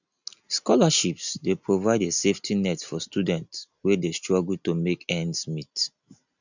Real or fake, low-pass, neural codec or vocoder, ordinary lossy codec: real; 7.2 kHz; none; none